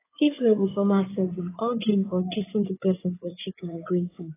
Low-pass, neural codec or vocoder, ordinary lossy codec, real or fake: 3.6 kHz; codec, 16 kHz in and 24 kHz out, 2.2 kbps, FireRedTTS-2 codec; AAC, 16 kbps; fake